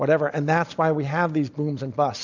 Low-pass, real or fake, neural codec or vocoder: 7.2 kHz; real; none